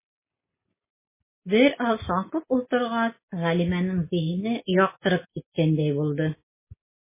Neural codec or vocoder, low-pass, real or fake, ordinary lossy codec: none; 3.6 kHz; real; MP3, 16 kbps